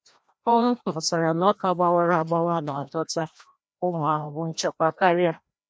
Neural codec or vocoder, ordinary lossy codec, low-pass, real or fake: codec, 16 kHz, 1 kbps, FreqCodec, larger model; none; none; fake